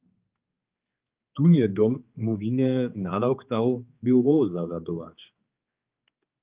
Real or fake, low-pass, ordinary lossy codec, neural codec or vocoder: fake; 3.6 kHz; Opus, 24 kbps; codec, 16 kHz, 4 kbps, X-Codec, HuBERT features, trained on general audio